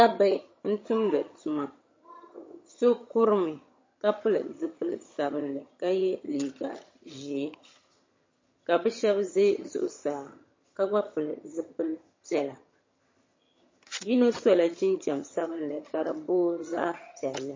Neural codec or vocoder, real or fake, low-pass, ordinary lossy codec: vocoder, 22.05 kHz, 80 mel bands, HiFi-GAN; fake; 7.2 kHz; MP3, 32 kbps